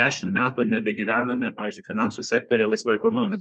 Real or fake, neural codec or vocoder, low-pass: fake; codec, 24 kHz, 1 kbps, SNAC; 9.9 kHz